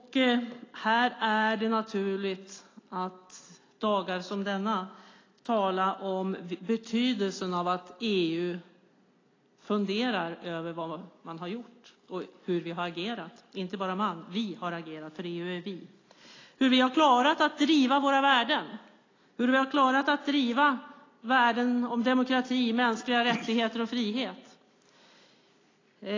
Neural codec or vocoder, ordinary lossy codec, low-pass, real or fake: none; AAC, 32 kbps; 7.2 kHz; real